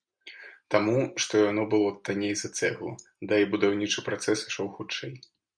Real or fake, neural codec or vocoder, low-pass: real; none; 9.9 kHz